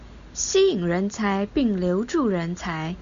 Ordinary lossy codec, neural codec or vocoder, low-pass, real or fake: Opus, 64 kbps; none; 7.2 kHz; real